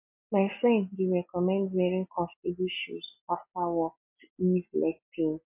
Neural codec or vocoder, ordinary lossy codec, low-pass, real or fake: none; MP3, 32 kbps; 3.6 kHz; real